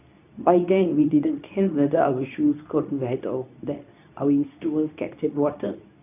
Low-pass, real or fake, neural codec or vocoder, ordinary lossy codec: 3.6 kHz; fake; codec, 24 kHz, 0.9 kbps, WavTokenizer, medium speech release version 2; none